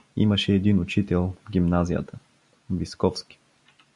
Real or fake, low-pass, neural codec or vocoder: real; 10.8 kHz; none